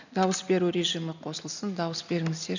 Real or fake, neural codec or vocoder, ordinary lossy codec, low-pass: real; none; none; 7.2 kHz